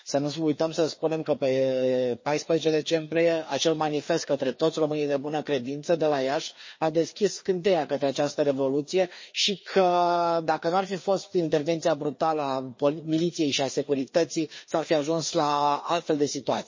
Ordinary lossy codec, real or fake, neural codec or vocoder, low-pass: MP3, 32 kbps; fake; codec, 16 kHz, 2 kbps, FreqCodec, larger model; 7.2 kHz